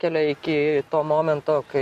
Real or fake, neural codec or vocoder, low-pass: real; none; 14.4 kHz